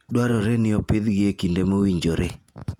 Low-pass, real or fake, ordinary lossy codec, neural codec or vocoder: 19.8 kHz; fake; none; vocoder, 48 kHz, 128 mel bands, Vocos